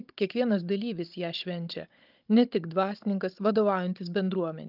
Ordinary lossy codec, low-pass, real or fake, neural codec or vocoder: Opus, 24 kbps; 5.4 kHz; fake; codec, 16 kHz, 16 kbps, FunCodec, trained on Chinese and English, 50 frames a second